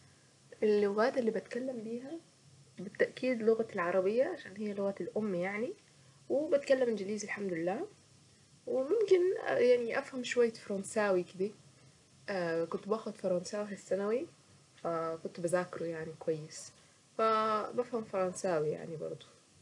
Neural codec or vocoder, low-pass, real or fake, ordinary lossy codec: none; 10.8 kHz; real; none